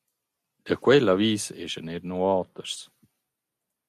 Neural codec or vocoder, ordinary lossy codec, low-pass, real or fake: none; MP3, 96 kbps; 14.4 kHz; real